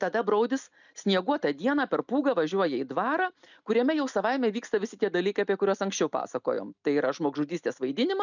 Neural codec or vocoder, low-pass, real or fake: none; 7.2 kHz; real